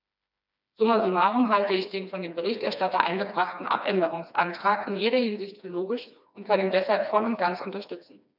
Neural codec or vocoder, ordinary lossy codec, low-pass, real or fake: codec, 16 kHz, 2 kbps, FreqCodec, smaller model; none; 5.4 kHz; fake